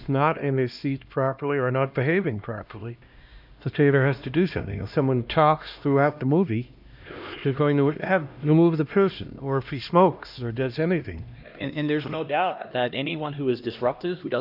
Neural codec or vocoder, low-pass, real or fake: codec, 16 kHz, 1 kbps, X-Codec, HuBERT features, trained on LibriSpeech; 5.4 kHz; fake